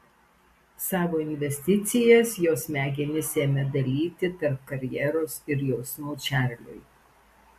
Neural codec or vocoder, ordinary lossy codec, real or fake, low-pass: none; MP3, 64 kbps; real; 14.4 kHz